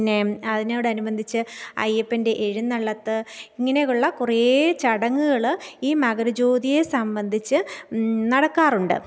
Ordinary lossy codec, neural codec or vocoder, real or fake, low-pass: none; none; real; none